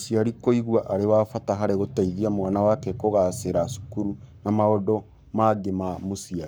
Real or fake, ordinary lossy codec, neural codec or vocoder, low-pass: fake; none; codec, 44.1 kHz, 7.8 kbps, Pupu-Codec; none